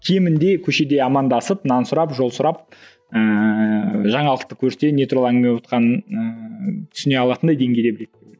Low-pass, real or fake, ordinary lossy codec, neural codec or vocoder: none; real; none; none